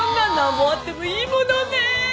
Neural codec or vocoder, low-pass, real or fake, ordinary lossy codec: none; none; real; none